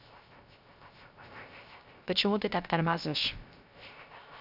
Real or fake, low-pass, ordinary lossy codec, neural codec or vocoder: fake; 5.4 kHz; none; codec, 16 kHz, 0.3 kbps, FocalCodec